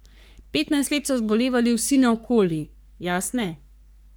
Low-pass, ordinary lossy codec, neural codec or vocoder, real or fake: none; none; codec, 44.1 kHz, 3.4 kbps, Pupu-Codec; fake